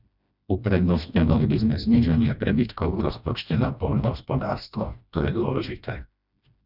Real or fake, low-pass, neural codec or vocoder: fake; 5.4 kHz; codec, 16 kHz, 1 kbps, FreqCodec, smaller model